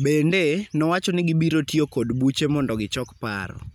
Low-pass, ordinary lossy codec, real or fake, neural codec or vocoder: 19.8 kHz; none; real; none